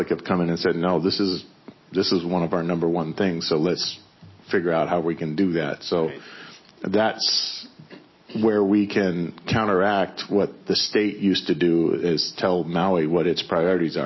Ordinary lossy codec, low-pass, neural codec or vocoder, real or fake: MP3, 24 kbps; 7.2 kHz; none; real